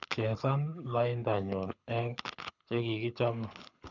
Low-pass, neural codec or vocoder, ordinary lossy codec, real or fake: 7.2 kHz; codec, 24 kHz, 6 kbps, HILCodec; none; fake